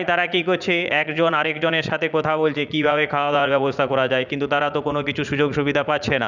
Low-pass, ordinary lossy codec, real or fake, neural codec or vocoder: 7.2 kHz; none; fake; vocoder, 44.1 kHz, 80 mel bands, Vocos